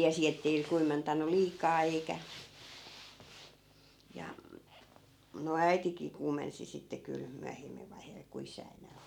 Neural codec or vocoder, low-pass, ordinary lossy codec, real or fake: none; 19.8 kHz; none; real